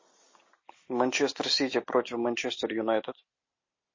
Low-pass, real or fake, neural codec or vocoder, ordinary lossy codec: 7.2 kHz; real; none; MP3, 32 kbps